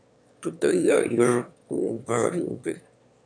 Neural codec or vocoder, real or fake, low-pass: autoencoder, 22.05 kHz, a latent of 192 numbers a frame, VITS, trained on one speaker; fake; 9.9 kHz